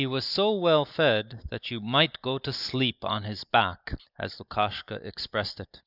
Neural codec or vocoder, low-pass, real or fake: none; 5.4 kHz; real